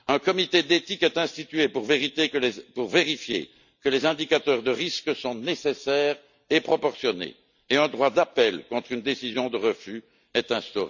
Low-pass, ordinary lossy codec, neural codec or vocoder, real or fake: 7.2 kHz; none; none; real